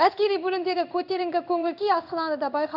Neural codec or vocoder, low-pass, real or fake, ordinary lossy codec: codec, 16 kHz in and 24 kHz out, 1 kbps, XY-Tokenizer; 5.4 kHz; fake; none